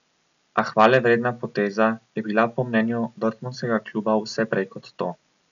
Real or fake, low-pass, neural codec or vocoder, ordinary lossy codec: real; 7.2 kHz; none; none